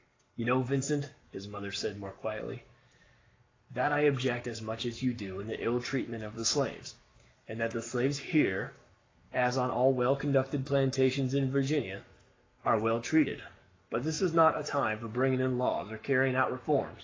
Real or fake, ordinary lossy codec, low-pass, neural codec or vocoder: fake; AAC, 32 kbps; 7.2 kHz; codec, 44.1 kHz, 7.8 kbps, Pupu-Codec